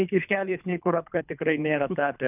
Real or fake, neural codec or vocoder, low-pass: fake; codec, 24 kHz, 3 kbps, HILCodec; 3.6 kHz